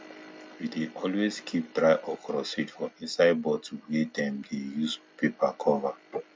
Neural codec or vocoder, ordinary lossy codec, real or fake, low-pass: none; none; real; none